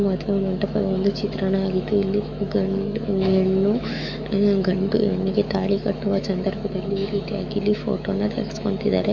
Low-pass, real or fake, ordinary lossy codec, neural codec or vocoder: 7.2 kHz; fake; none; autoencoder, 48 kHz, 128 numbers a frame, DAC-VAE, trained on Japanese speech